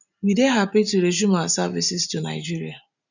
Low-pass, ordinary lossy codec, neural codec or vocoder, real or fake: 7.2 kHz; none; none; real